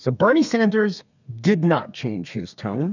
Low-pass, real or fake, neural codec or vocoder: 7.2 kHz; fake; codec, 32 kHz, 1.9 kbps, SNAC